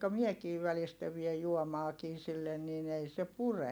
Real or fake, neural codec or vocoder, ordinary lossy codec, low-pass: real; none; none; none